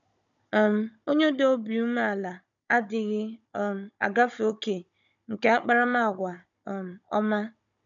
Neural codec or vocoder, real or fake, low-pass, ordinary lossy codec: codec, 16 kHz, 16 kbps, FunCodec, trained on Chinese and English, 50 frames a second; fake; 7.2 kHz; none